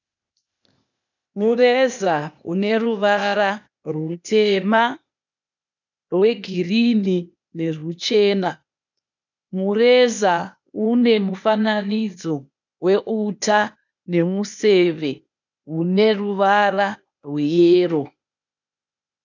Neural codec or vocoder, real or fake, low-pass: codec, 16 kHz, 0.8 kbps, ZipCodec; fake; 7.2 kHz